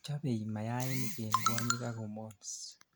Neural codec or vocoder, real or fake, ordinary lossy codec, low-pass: none; real; none; none